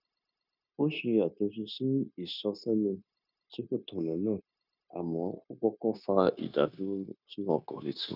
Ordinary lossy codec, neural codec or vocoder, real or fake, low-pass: none; codec, 16 kHz, 0.9 kbps, LongCat-Audio-Codec; fake; 5.4 kHz